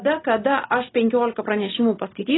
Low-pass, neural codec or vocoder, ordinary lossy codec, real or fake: 7.2 kHz; none; AAC, 16 kbps; real